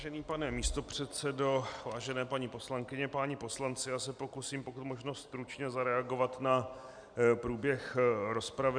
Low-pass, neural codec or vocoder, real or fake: 9.9 kHz; none; real